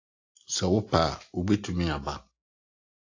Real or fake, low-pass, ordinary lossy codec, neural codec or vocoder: real; 7.2 kHz; AAC, 48 kbps; none